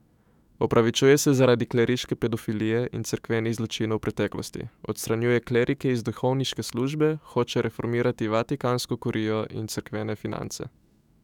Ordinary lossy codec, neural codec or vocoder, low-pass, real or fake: none; autoencoder, 48 kHz, 128 numbers a frame, DAC-VAE, trained on Japanese speech; 19.8 kHz; fake